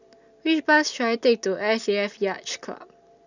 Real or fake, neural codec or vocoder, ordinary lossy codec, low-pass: real; none; none; 7.2 kHz